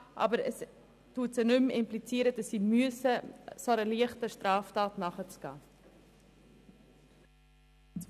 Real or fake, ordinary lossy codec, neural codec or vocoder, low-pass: real; none; none; 14.4 kHz